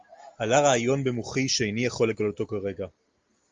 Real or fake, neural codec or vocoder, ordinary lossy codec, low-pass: real; none; Opus, 32 kbps; 7.2 kHz